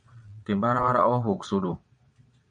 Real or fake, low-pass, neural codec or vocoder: fake; 9.9 kHz; vocoder, 22.05 kHz, 80 mel bands, Vocos